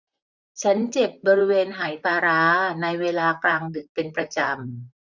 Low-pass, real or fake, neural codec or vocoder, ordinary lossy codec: 7.2 kHz; fake; vocoder, 44.1 kHz, 128 mel bands, Pupu-Vocoder; none